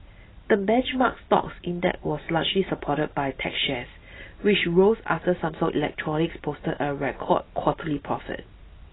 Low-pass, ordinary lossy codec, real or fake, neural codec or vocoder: 7.2 kHz; AAC, 16 kbps; real; none